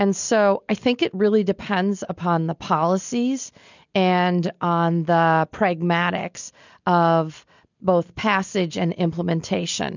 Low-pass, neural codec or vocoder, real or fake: 7.2 kHz; none; real